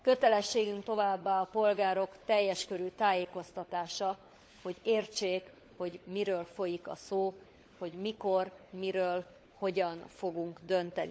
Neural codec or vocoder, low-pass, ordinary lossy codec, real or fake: codec, 16 kHz, 16 kbps, FunCodec, trained on LibriTTS, 50 frames a second; none; none; fake